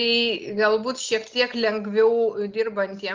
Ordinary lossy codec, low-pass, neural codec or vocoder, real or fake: Opus, 32 kbps; 7.2 kHz; none; real